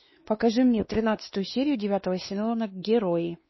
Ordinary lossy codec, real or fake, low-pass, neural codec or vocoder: MP3, 24 kbps; fake; 7.2 kHz; codec, 16 kHz, 2 kbps, X-Codec, WavLM features, trained on Multilingual LibriSpeech